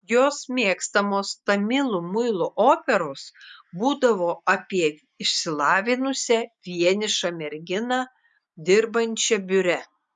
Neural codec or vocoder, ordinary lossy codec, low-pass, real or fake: none; MP3, 96 kbps; 10.8 kHz; real